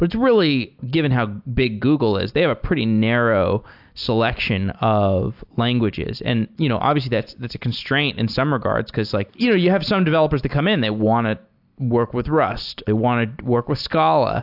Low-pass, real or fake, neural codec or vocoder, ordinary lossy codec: 5.4 kHz; real; none; AAC, 48 kbps